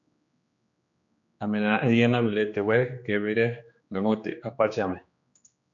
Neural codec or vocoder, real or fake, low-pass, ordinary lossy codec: codec, 16 kHz, 2 kbps, X-Codec, HuBERT features, trained on general audio; fake; 7.2 kHz; AAC, 64 kbps